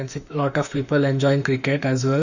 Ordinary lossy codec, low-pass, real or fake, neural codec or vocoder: none; 7.2 kHz; fake; autoencoder, 48 kHz, 32 numbers a frame, DAC-VAE, trained on Japanese speech